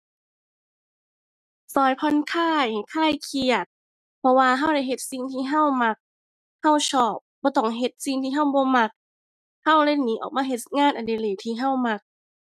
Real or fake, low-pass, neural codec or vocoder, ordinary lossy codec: real; 14.4 kHz; none; none